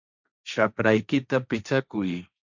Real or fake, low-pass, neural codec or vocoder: fake; 7.2 kHz; codec, 16 kHz, 1.1 kbps, Voila-Tokenizer